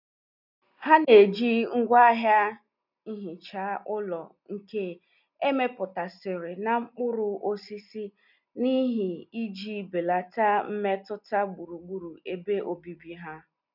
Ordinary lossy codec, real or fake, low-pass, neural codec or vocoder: none; real; 5.4 kHz; none